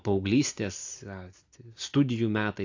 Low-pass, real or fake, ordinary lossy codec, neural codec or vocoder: 7.2 kHz; real; AAC, 48 kbps; none